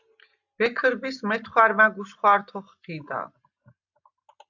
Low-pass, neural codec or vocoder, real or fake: 7.2 kHz; none; real